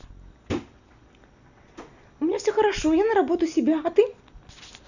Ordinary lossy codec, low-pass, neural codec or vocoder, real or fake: none; 7.2 kHz; none; real